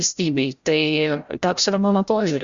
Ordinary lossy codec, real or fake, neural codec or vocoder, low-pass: Opus, 64 kbps; fake; codec, 16 kHz, 0.5 kbps, FreqCodec, larger model; 7.2 kHz